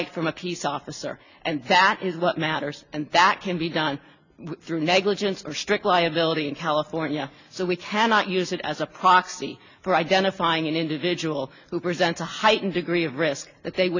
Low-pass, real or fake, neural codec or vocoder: 7.2 kHz; real; none